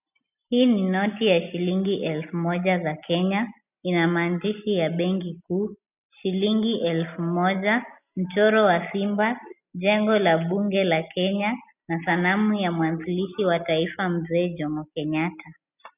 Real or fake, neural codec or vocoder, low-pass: real; none; 3.6 kHz